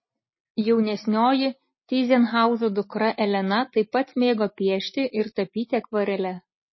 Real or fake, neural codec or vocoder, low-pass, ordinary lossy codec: real; none; 7.2 kHz; MP3, 24 kbps